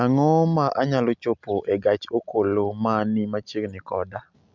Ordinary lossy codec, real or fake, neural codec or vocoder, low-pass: MP3, 64 kbps; real; none; 7.2 kHz